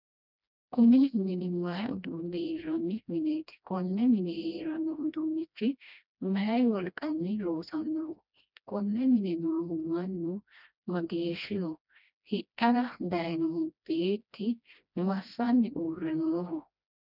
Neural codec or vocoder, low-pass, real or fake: codec, 16 kHz, 1 kbps, FreqCodec, smaller model; 5.4 kHz; fake